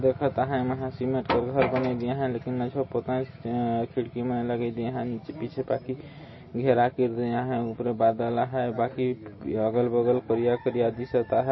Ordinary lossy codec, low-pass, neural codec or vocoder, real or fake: MP3, 24 kbps; 7.2 kHz; none; real